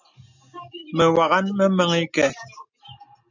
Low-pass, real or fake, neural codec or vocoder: 7.2 kHz; real; none